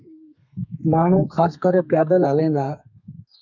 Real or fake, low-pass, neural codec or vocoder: fake; 7.2 kHz; codec, 32 kHz, 1.9 kbps, SNAC